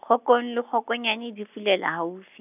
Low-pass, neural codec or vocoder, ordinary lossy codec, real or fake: 3.6 kHz; none; none; real